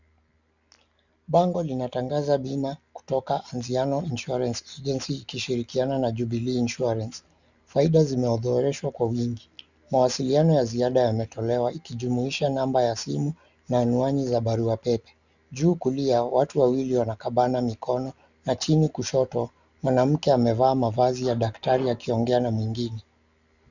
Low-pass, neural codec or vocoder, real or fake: 7.2 kHz; none; real